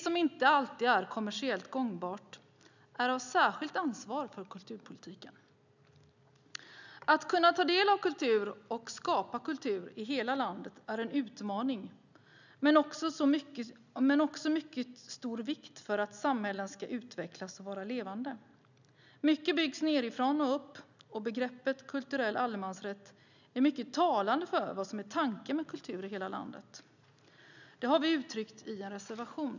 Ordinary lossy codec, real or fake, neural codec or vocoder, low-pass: none; real; none; 7.2 kHz